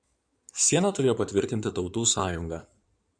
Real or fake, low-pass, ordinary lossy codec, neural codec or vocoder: fake; 9.9 kHz; MP3, 96 kbps; codec, 16 kHz in and 24 kHz out, 2.2 kbps, FireRedTTS-2 codec